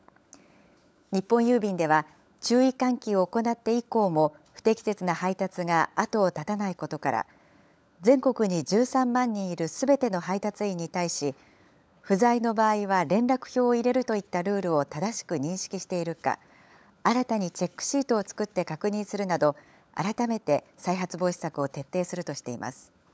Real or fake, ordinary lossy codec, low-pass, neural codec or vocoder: fake; none; none; codec, 16 kHz, 16 kbps, FunCodec, trained on LibriTTS, 50 frames a second